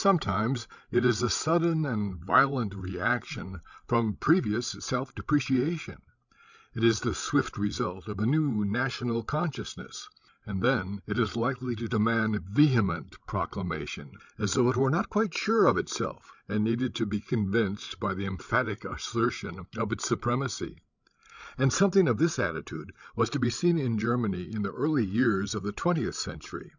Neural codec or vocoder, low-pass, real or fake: codec, 16 kHz, 16 kbps, FreqCodec, larger model; 7.2 kHz; fake